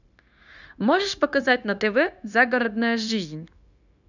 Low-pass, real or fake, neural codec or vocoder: 7.2 kHz; fake; codec, 16 kHz, 0.9 kbps, LongCat-Audio-Codec